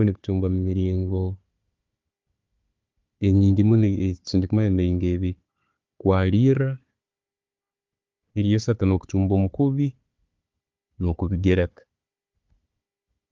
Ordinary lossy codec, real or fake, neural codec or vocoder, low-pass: Opus, 32 kbps; fake; codec, 16 kHz, 4 kbps, FunCodec, trained on Chinese and English, 50 frames a second; 7.2 kHz